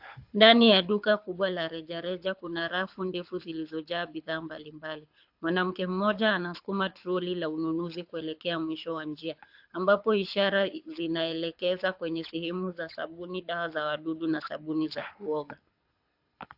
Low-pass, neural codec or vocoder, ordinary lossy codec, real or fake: 5.4 kHz; codec, 24 kHz, 6 kbps, HILCodec; AAC, 48 kbps; fake